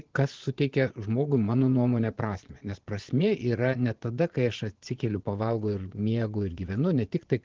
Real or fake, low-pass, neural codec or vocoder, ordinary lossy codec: fake; 7.2 kHz; vocoder, 22.05 kHz, 80 mel bands, Vocos; Opus, 16 kbps